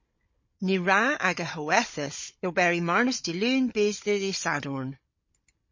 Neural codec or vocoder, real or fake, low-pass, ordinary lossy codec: codec, 16 kHz, 16 kbps, FunCodec, trained on Chinese and English, 50 frames a second; fake; 7.2 kHz; MP3, 32 kbps